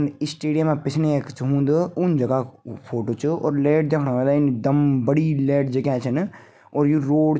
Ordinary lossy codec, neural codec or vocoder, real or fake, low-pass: none; none; real; none